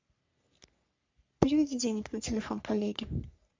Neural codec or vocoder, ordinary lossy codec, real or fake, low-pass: codec, 44.1 kHz, 3.4 kbps, Pupu-Codec; MP3, 64 kbps; fake; 7.2 kHz